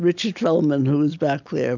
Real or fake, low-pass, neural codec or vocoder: real; 7.2 kHz; none